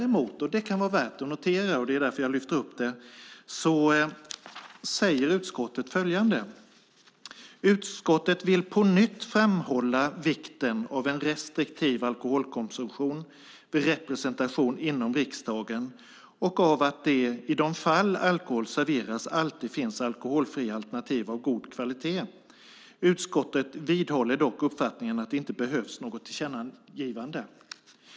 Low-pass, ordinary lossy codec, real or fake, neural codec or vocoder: none; none; real; none